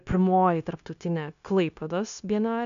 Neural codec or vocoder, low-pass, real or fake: codec, 16 kHz, 0.9 kbps, LongCat-Audio-Codec; 7.2 kHz; fake